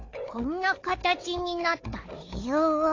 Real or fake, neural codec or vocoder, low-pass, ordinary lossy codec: fake; codec, 16 kHz, 4 kbps, FunCodec, trained on Chinese and English, 50 frames a second; 7.2 kHz; AAC, 32 kbps